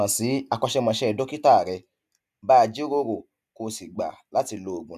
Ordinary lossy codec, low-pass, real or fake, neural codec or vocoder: none; 14.4 kHz; real; none